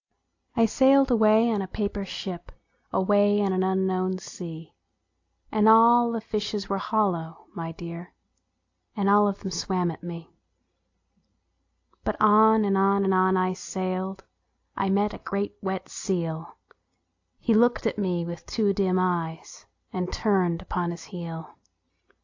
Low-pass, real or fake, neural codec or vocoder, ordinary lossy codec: 7.2 kHz; real; none; AAC, 48 kbps